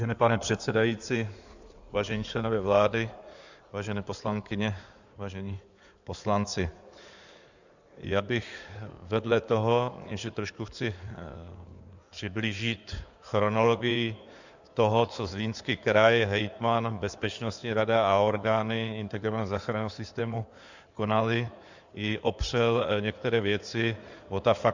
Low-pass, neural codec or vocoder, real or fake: 7.2 kHz; codec, 16 kHz in and 24 kHz out, 2.2 kbps, FireRedTTS-2 codec; fake